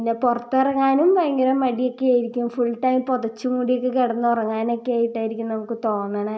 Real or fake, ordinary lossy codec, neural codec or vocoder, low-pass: real; none; none; none